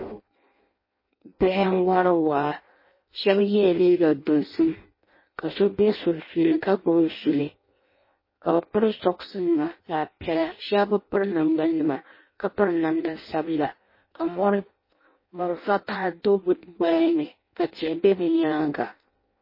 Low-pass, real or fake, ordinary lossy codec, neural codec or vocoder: 5.4 kHz; fake; MP3, 24 kbps; codec, 16 kHz in and 24 kHz out, 0.6 kbps, FireRedTTS-2 codec